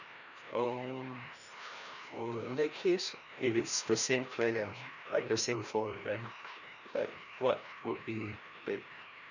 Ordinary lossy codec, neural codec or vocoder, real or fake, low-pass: none; codec, 16 kHz, 1 kbps, FreqCodec, larger model; fake; 7.2 kHz